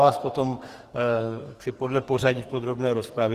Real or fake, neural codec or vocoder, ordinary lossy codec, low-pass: fake; codec, 44.1 kHz, 2.6 kbps, SNAC; Opus, 24 kbps; 14.4 kHz